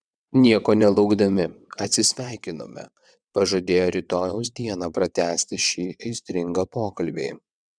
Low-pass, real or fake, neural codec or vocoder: 9.9 kHz; fake; vocoder, 22.05 kHz, 80 mel bands, WaveNeXt